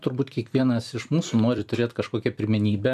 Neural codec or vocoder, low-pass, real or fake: vocoder, 44.1 kHz, 128 mel bands every 256 samples, BigVGAN v2; 14.4 kHz; fake